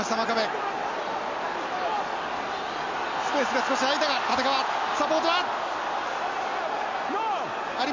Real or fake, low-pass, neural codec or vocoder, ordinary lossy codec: real; 7.2 kHz; none; MP3, 48 kbps